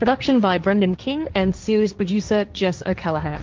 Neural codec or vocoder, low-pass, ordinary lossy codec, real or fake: codec, 16 kHz, 1.1 kbps, Voila-Tokenizer; 7.2 kHz; Opus, 32 kbps; fake